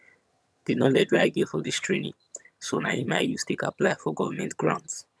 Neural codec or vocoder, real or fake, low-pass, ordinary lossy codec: vocoder, 22.05 kHz, 80 mel bands, HiFi-GAN; fake; none; none